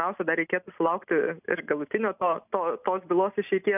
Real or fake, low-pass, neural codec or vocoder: real; 3.6 kHz; none